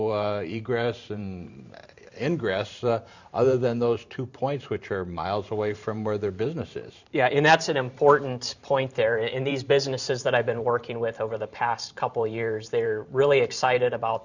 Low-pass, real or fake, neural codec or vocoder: 7.2 kHz; fake; vocoder, 44.1 kHz, 128 mel bands every 512 samples, BigVGAN v2